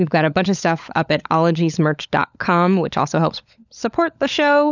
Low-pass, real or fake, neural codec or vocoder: 7.2 kHz; fake; codec, 16 kHz, 16 kbps, FunCodec, trained on LibriTTS, 50 frames a second